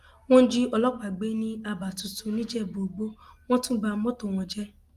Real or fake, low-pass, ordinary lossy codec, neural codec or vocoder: real; 14.4 kHz; Opus, 32 kbps; none